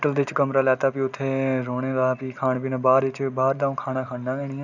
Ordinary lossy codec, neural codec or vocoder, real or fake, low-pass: none; none; real; 7.2 kHz